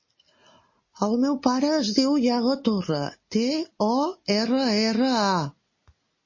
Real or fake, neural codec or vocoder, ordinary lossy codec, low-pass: real; none; MP3, 32 kbps; 7.2 kHz